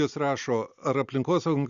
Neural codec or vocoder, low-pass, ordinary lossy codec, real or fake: none; 7.2 kHz; Opus, 64 kbps; real